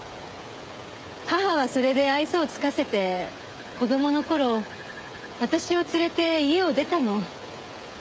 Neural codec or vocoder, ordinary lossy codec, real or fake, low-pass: codec, 16 kHz, 16 kbps, FreqCodec, smaller model; none; fake; none